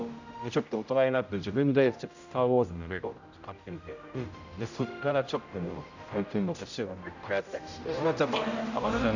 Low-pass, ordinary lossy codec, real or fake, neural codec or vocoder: 7.2 kHz; none; fake; codec, 16 kHz, 0.5 kbps, X-Codec, HuBERT features, trained on general audio